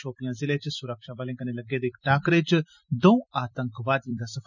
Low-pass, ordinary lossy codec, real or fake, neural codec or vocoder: 7.2 kHz; none; fake; vocoder, 44.1 kHz, 128 mel bands every 256 samples, BigVGAN v2